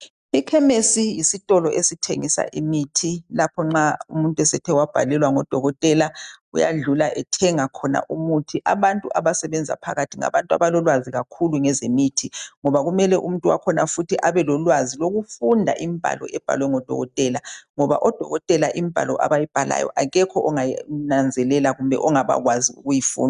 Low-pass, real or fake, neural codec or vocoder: 10.8 kHz; real; none